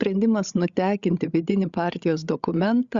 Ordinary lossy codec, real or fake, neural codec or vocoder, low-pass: Opus, 64 kbps; fake; codec, 16 kHz, 16 kbps, FreqCodec, larger model; 7.2 kHz